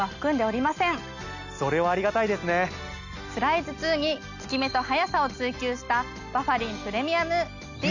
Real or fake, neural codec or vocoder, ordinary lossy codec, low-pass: real; none; none; 7.2 kHz